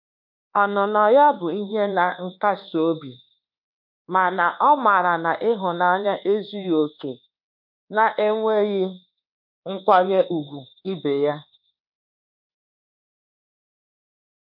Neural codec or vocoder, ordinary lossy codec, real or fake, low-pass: codec, 24 kHz, 1.2 kbps, DualCodec; none; fake; 5.4 kHz